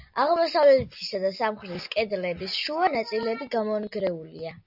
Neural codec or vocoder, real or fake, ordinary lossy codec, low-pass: none; real; AAC, 48 kbps; 5.4 kHz